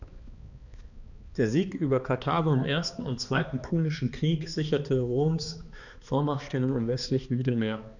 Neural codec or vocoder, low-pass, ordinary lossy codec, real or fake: codec, 16 kHz, 2 kbps, X-Codec, HuBERT features, trained on balanced general audio; 7.2 kHz; none; fake